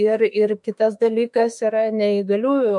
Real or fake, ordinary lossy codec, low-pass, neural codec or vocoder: fake; MP3, 64 kbps; 10.8 kHz; autoencoder, 48 kHz, 32 numbers a frame, DAC-VAE, trained on Japanese speech